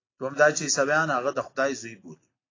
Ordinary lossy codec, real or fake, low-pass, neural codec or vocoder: AAC, 32 kbps; real; 7.2 kHz; none